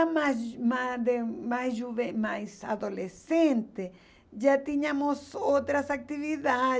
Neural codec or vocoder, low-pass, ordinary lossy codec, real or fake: none; none; none; real